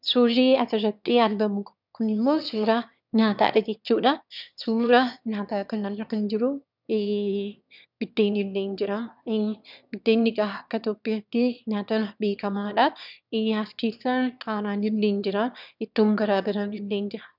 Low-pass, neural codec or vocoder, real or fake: 5.4 kHz; autoencoder, 22.05 kHz, a latent of 192 numbers a frame, VITS, trained on one speaker; fake